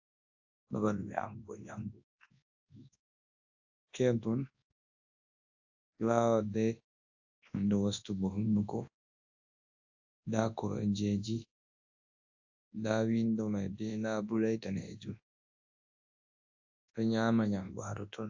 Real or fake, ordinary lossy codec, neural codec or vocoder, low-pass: fake; AAC, 48 kbps; codec, 24 kHz, 0.9 kbps, WavTokenizer, large speech release; 7.2 kHz